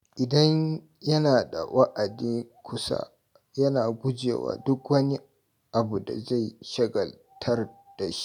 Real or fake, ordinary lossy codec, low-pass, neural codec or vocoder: real; none; 19.8 kHz; none